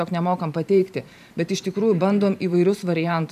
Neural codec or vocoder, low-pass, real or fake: vocoder, 44.1 kHz, 128 mel bands every 512 samples, BigVGAN v2; 14.4 kHz; fake